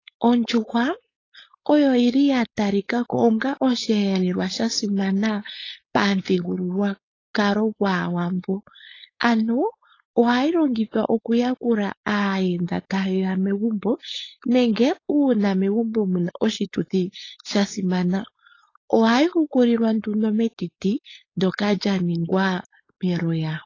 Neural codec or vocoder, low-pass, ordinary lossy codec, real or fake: codec, 16 kHz, 4.8 kbps, FACodec; 7.2 kHz; AAC, 32 kbps; fake